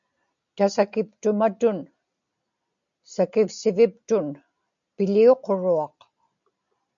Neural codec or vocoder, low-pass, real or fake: none; 7.2 kHz; real